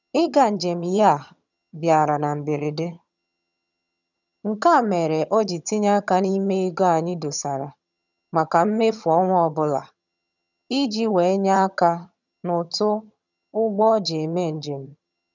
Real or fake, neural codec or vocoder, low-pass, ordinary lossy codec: fake; vocoder, 22.05 kHz, 80 mel bands, HiFi-GAN; 7.2 kHz; none